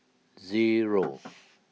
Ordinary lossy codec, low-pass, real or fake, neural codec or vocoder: none; none; real; none